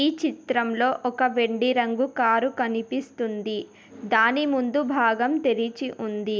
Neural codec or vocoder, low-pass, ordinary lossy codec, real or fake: none; none; none; real